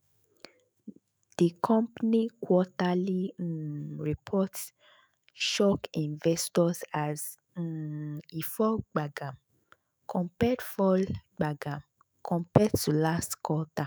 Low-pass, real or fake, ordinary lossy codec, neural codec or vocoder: none; fake; none; autoencoder, 48 kHz, 128 numbers a frame, DAC-VAE, trained on Japanese speech